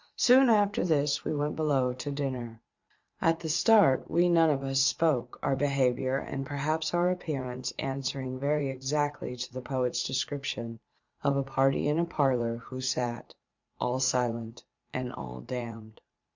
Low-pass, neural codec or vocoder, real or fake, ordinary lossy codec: 7.2 kHz; vocoder, 44.1 kHz, 80 mel bands, Vocos; fake; Opus, 64 kbps